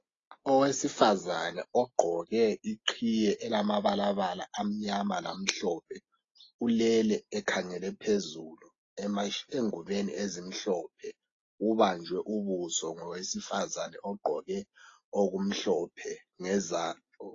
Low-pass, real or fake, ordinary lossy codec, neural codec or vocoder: 7.2 kHz; real; AAC, 32 kbps; none